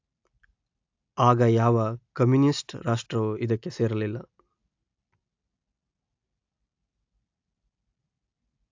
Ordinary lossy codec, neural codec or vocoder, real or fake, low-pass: AAC, 48 kbps; none; real; 7.2 kHz